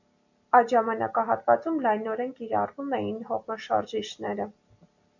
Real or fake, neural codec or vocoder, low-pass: real; none; 7.2 kHz